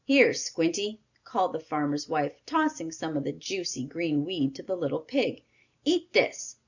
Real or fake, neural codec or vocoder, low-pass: real; none; 7.2 kHz